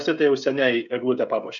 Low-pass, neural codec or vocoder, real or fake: 7.2 kHz; codec, 16 kHz, 16 kbps, FreqCodec, smaller model; fake